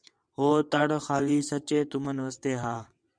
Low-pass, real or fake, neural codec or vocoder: 9.9 kHz; fake; vocoder, 22.05 kHz, 80 mel bands, WaveNeXt